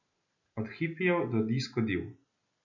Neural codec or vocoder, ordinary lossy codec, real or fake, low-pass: none; none; real; 7.2 kHz